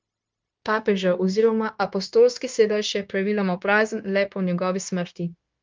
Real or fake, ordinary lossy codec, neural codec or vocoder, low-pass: fake; Opus, 24 kbps; codec, 16 kHz, 0.9 kbps, LongCat-Audio-Codec; 7.2 kHz